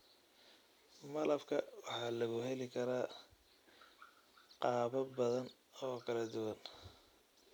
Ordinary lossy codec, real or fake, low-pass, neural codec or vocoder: none; real; none; none